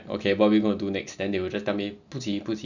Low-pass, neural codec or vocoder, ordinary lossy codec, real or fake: 7.2 kHz; none; none; real